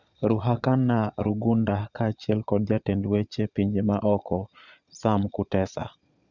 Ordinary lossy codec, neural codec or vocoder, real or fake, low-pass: none; vocoder, 22.05 kHz, 80 mel bands, Vocos; fake; 7.2 kHz